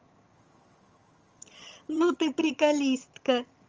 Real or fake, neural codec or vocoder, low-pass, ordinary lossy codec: fake; vocoder, 22.05 kHz, 80 mel bands, HiFi-GAN; 7.2 kHz; Opus, 24 kbps